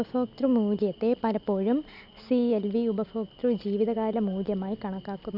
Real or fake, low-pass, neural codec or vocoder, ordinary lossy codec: real; 5.4 kHz; none; none